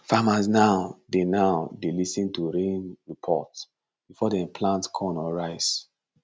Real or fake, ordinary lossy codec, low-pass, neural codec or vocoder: real; none; none; none